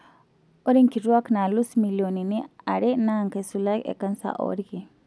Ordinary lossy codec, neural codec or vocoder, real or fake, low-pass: none; none; real; none